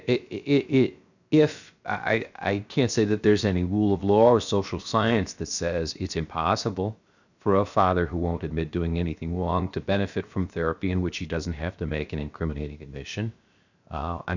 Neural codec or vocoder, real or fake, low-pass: codec, 16 kHz, 0.7 kbps, FocalCodec; fake; 7.2 kHz